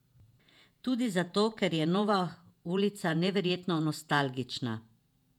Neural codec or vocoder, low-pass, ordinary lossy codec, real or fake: vocoder, 48 kHz, 128 mel bands, Vocos; 19.8 kHz; none; fake